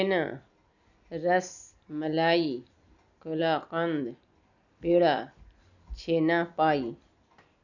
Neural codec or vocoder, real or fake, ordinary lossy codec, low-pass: none; real; none; 7.2 kHz